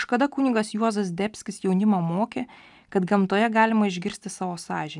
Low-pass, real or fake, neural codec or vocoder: 10.8 kHz; real; none